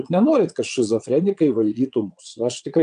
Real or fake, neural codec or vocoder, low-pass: fake; vocoder, 22.05 kHz, 80 mel bands, Vocos; 9.9 kHz